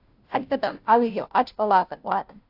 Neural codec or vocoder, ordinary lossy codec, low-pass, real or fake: codec, 16 kHz, 0.5 kbps, FunCodec, trained on Chinese and English, 25 frames a second; AAC, 48 kbps; 5.4 kHz; fake